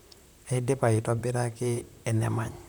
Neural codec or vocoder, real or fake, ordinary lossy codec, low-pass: vocoder, 44.1 kHz, 128 mel bands, Pupu-Vocoder; fake; none; none